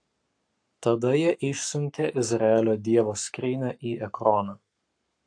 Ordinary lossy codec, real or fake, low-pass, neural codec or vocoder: AAC, 64 kbps; fake; 9.9 kHz; codec, 44.1 kHz, 7.8 kbps, Pupu-Codec